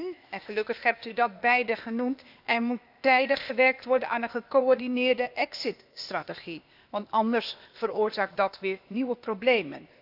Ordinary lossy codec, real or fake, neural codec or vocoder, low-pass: none; fake; codec, 16 kHz, 0.8 kbps, ZipCodec; 5.4 kHz